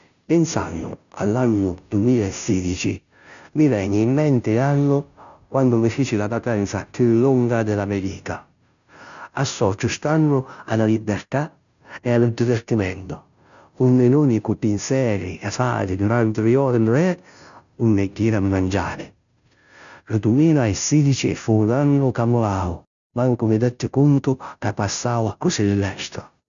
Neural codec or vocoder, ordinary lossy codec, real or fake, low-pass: codec, 16 kHz, 0.5 kbps, FunCodec, trained on Chinese and English, 25 frames a second; none; fake; 7.2 kHz